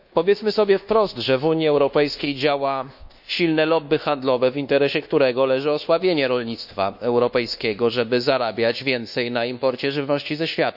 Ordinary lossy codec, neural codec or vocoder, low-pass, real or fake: none; codec, 24 kHz, 1.2 kbps, DualCodec; 5.4 kHz; fake